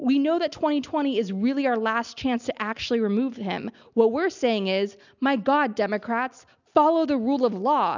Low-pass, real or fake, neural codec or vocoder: 7.2 kHz; real; none